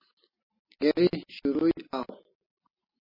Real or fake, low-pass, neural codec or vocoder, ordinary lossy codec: real; 5.4 kHz; none; MP3, 24 kbps